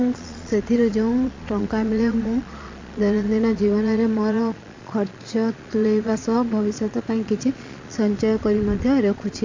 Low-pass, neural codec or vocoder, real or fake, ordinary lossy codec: 7.2 kHz; vocoder, 22.05 kHz, 80 mel bands, Vocos; fake; MP3, 48 kbps